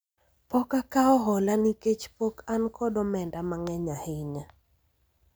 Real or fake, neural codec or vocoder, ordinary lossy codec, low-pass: real; none; none; none